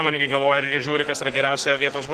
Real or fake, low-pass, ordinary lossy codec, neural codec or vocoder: fake; 14.4 kHz; Opus, 16 kbps; codec, 44.1 kHz, 2.6 kbps, SNAC